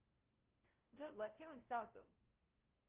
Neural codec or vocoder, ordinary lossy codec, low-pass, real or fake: codec, 16 kHz, 0.5 kbps, FunCodec, trained on LibriTTS, 25 frames a second; Opus, 16 kbps; 3.6 kHz; fake